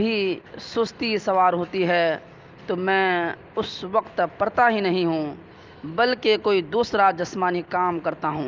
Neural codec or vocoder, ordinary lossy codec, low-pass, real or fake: none; Opus, 32 kbps; 7.2 kHz; real